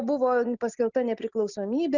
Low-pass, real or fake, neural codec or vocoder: 7.2 kHz; real; none